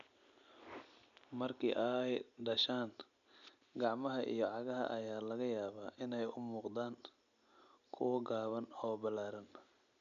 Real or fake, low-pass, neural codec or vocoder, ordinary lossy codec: real; 7.2 kHz; none; none